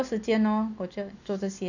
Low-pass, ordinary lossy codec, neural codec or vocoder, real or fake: 7.2 kHz; none; none; real